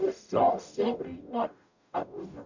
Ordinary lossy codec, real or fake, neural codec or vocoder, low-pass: none; fake; codec, 44.1 kHz, 0.9 kbps, DAC; 7.2 kHz